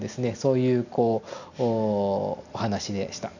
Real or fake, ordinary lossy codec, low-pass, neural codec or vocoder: real; none; 7.2 kHz; none